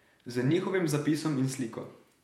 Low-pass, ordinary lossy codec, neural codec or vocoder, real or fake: 19.8 kHz; MP3, 64 kbps; vocoder, 48 kHz, 128 mel bands, Vocos; fake